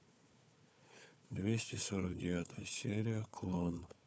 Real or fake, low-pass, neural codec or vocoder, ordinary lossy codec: fake; none; codec, 16 kHz, 4 kbps, FunCodec, trained on Chinese and English, 50 frames a second; none